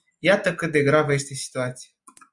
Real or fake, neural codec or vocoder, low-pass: real; none; 10.8 kHz